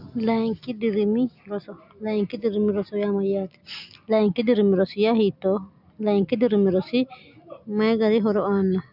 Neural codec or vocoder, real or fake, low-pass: none; real; 5.4 kHz